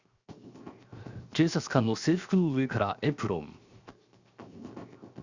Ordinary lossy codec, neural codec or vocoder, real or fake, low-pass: Opus, 64 kbps; codec, 16 kHz, 0.7 kbps, FocalCodec; fake; 7.2 kHz